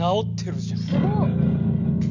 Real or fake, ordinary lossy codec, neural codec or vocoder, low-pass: real; none; none; 7.2 kHz